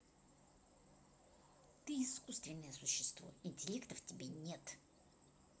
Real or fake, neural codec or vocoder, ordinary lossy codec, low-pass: real; none; none; none